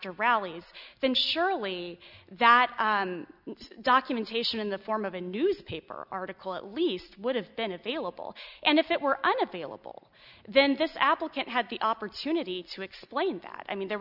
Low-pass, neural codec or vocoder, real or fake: 5.4 kHz; none; real